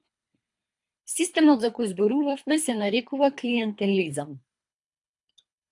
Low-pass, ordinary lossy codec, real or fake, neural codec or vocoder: 10.8 kHz; AAC, 64 kbps; fake; codec, 24 kHz, 3 kbps, HILCodec